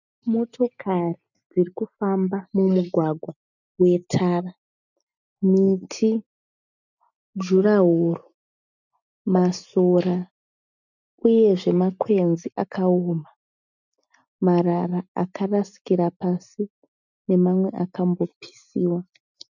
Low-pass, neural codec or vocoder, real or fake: 7.2 kHz; none; real